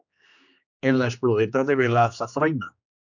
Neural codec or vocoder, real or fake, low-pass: codec, 16 kHz, 2 kbps, X-Codec, HuBERT features, trained on general audio; fake; 7.2 kHz